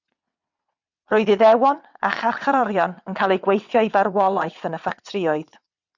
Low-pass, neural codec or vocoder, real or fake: 7.2 kHz; vocoder, 22.05 kHz, 80 mel bands, WaveNeXt; fake